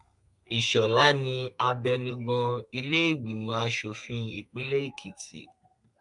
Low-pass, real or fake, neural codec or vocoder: 10.8 kHz; fake; codec, 32 kHz, 1.9 kbps, SNAC